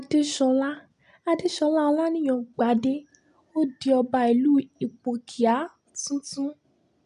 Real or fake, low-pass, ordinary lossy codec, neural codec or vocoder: real; 10.8 kHz; none; none